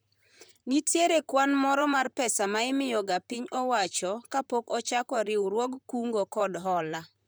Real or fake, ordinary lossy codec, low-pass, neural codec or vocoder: fake; none; none; vocoder, 44.1 kHz, 128 mel bands, Pupu-Vocoder